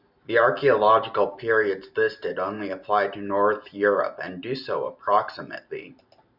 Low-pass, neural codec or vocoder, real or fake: 5.4 kHz; none; real